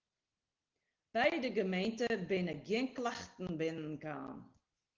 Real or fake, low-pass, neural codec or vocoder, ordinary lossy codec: real; 7.2 kHz; none; Opus, 16 kbps